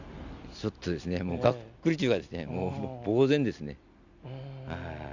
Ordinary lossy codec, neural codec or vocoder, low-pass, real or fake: none; none; 7.2 kHz; real